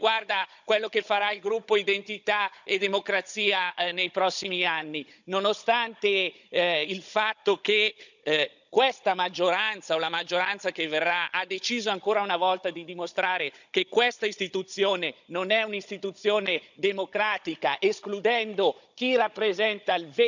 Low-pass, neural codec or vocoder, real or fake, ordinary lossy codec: 7.2 kHz; codec, 16 kHz, 16 kbps, FunCodec, trained on Chinese and English, 50 frames a second; fake; none